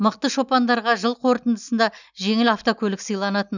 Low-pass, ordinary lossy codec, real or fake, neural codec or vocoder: 7.2 kHz; none; real; none